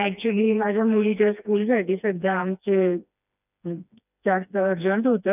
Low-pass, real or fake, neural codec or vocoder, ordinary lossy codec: 3.6 kHz; fake; codec, 16 kHz, 2 kbps, FreqCodec, smaller model; none